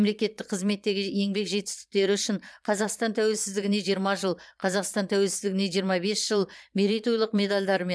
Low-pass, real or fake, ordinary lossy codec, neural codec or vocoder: none; fake; none; vocoder, 22.05 kHz, 80 mel bands, Vocos